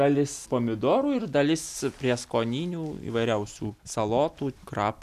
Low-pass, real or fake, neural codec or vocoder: 14.4 kHz; real; none